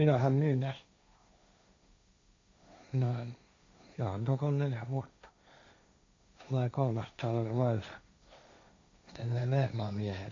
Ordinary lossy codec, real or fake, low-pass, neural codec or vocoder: AAC, 48 kbps; fake; 7.2 kHz; codec, 16 kHz, 1.1 kbps, Voila-Tokenizer